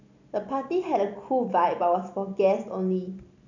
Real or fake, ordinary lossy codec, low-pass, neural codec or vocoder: real; none; 7.2 kHz; none